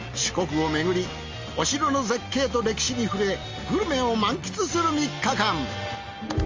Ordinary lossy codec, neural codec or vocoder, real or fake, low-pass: Opus, 32 kbps; none; real; 7.2 kHz